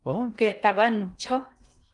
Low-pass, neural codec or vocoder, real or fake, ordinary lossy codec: 10.8 kHz; codec, 16 kHz in and 24 kHz out, 0.6 kbps, FocalCodec, streaming, 2048 codes; fake; Opus, 32 kbps